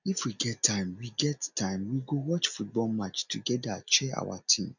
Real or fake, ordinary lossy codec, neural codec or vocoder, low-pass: real; none; none; 7.2 kHz